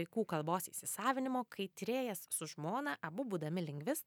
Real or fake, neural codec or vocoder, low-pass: real; none; 19.8 kHz